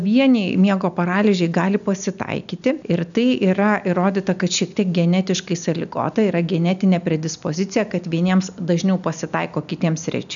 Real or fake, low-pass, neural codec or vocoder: real; 7.2 kHz; none